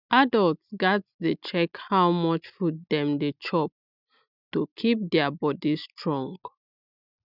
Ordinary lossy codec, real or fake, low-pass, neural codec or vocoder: none; real; 5.4 kHz; none